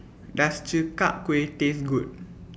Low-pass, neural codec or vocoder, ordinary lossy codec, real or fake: none; none; none; real